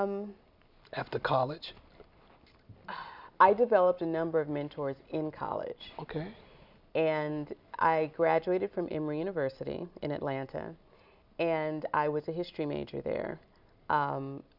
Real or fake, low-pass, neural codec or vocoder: real; 5.4 kHz; none